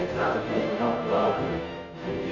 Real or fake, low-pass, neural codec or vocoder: fake; 7.2 kHz; codec, 16 kHz, 0.5 kbps, FunCodec, trained on Chinese and English, 25 frames a second